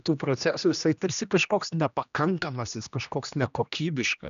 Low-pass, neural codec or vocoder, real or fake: 7.2 kHz; codec, 16 kHz, 1 kbps, X-Codec, HuBERT features, trained on general audio; fake